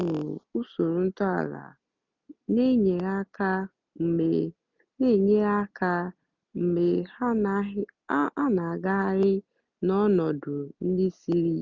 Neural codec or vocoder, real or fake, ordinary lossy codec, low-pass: none; real; none; 7.2 kHz